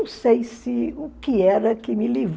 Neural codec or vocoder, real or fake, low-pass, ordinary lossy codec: none; real; none; none